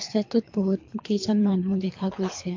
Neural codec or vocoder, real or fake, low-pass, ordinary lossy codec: codec, 24 kHz, 3 kbps, HILCodec; fake; 7.2 kHz; MP3, 48 kbps